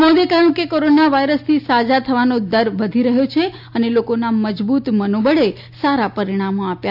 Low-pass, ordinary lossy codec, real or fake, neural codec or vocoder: 5.4 kHz; AAC, 48 kbps; real; none